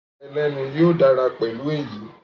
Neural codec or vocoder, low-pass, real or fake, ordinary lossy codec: none; 7.2 kHz; real; AAC, 48 kbps